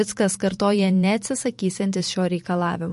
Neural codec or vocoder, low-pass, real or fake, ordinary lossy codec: vocoder, 44.1 kHz, 128 mel bands every 512 samples, BigVGAN v2; 14.4 kHz; fake; MP3, 48 kbps